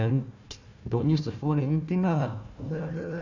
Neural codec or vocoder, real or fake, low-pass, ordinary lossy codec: codec, 16 kHz, 1 kbps, FunCodec, trained on Chinese and English, 50 frames a second; fake; 7.2 kHz; none